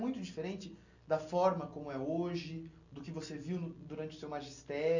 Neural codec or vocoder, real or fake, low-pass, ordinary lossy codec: none; real; 7.2 kHz; none